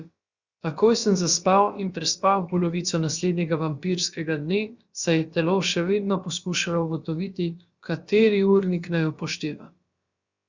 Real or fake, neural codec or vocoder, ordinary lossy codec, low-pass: fake; codec, 16 kHz, about 1 kbps, DyCAST, with the encoder's durations; Opus, 64 kbps; 7.2 kHz